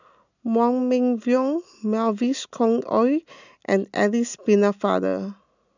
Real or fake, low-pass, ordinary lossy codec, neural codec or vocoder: real; 7.2 kHz; none; none